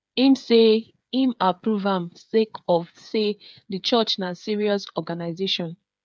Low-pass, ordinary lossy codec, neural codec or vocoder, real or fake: none; none; codec, 16 kHz, 8 kbps, FreqCodec, smaller model; fake